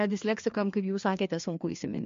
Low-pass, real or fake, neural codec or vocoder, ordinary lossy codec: 7.2 kHz; fake; codec, 16 kHz, 2 kbps, FreqCodec, larger model; MP3, 48 kbps